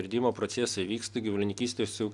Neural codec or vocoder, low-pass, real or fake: none; 10.8 kHz; real